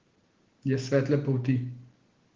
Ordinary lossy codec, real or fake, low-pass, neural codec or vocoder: Opus, 16 kbps; real; 7.2 kHz; none